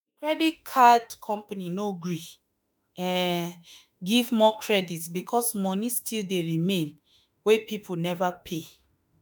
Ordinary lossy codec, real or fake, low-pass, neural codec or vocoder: none; fake; none; autoencoder, 48 kHz, 32 numbers a frame, DAC-VAE, trained on Japanese speech